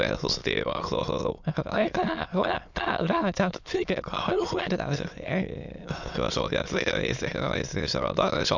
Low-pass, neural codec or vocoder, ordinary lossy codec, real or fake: 7.2 kHz; autoencoder, 22.05 kHz, a latent of 192 numbers a frame, VITS, trained on many speakers; none; fake